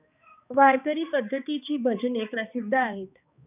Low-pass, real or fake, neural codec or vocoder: 3.6 kHz; fake; codec, 16 kHz, 2 kbps, X-Codec, HuBERT features, trained on balanced general audio